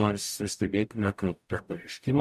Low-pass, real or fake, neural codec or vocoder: 14.4 kHz; fake; codec, 44.1 kHz, 0.9 kbps, DAC